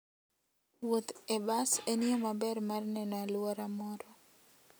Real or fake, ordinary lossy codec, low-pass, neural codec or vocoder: real; none; none; none